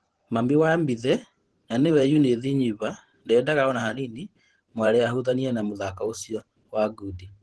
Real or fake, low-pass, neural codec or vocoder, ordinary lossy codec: fake; 10.8 kHz; vocoder, 44.1 kHz, 128 mel bands every 512 samples, BigVGAN v2; Opus, 16 kbps